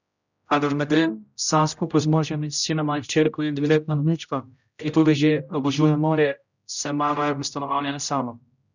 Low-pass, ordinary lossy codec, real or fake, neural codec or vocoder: 7.2 kHz; none; fake; codec, 16 kHz, 0.5 kbps, X-Codec, HuBERT features, trained on general audio